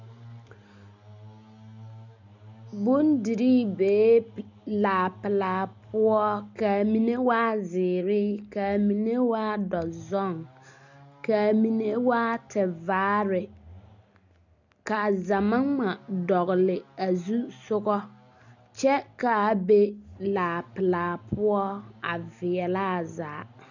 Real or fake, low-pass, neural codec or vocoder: real; 7.2 kHz; none